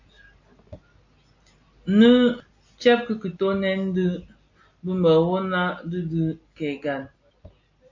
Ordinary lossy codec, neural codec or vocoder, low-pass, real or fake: AAC, 48 kbps; none; 7.2 kHz; real